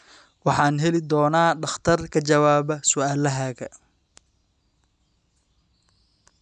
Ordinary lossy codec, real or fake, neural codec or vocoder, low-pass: none; real; none; 9.9 kHz